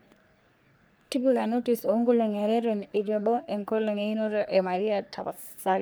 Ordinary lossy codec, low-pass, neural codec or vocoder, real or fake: none; none; codec, 44.1 kHz, 3.4 kbps, Pupu-Codec; fake